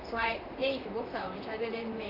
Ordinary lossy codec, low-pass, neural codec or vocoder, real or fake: AAC, 32 kbps; 5.4 kHz; vocoder, 44.1 kHz, 128 mel bands, Pupu-Vocoder; fake